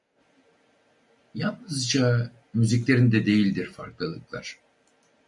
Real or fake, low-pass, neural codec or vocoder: real; 10.8 kHz; none